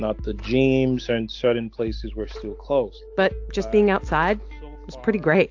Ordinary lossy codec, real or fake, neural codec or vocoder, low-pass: AAC, 48 kbps; real; none; 7.2 kHz